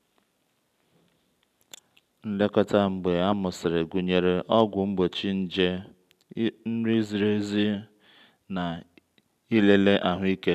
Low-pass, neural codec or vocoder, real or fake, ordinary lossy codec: 14.4 kHz; none; real; none